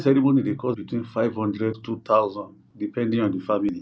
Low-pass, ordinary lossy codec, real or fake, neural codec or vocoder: none; none; real; none